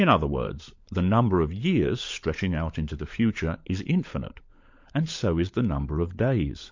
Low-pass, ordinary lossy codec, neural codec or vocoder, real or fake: 7.2 kHz; MP3, 48 kbps; codec, 16 kHz, 16 kbps, FunCodec, trained on LibriTTS, 50 frames a second; fake